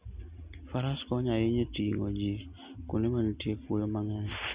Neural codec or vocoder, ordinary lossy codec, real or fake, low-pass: none; Opus, 64 kbps; real; 3.6 kHz